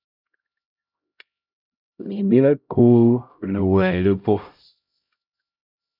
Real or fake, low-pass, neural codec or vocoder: fake; 5.4 kHz; codec, 16 kHz, 0.5 kbps, X-Codec, HuBERT features, trained on LibriSpeech